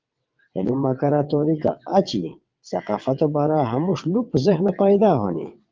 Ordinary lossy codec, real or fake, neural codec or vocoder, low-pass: Opus, 24 kbps; fake; vocoder, 22.05 kHz, 80 mel bands, WaveNeXt; 7.2 kHz